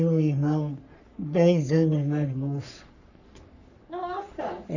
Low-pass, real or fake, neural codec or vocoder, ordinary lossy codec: 7.2 kHz; fake; codec, 44.1 kHz, 3.4 kbps, Pupu-Codec; none